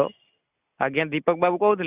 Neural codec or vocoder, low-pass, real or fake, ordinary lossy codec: none; 3.6 kHz; real; Opus, 64 kbps